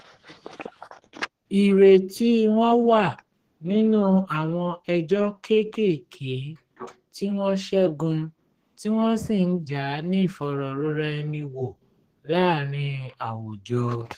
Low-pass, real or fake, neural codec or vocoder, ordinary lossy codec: 14.4 kHz; fake; codec, 32 kHz, 1.9 kbps, SNAC; Opus, 16 kbps